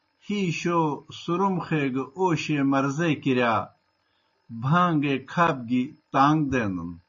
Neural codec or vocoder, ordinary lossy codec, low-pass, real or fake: none; MP3, 32 kbps; 7.2 kHz; real